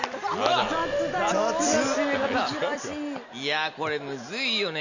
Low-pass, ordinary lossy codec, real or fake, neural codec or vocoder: 7.2 kHz; none; real; none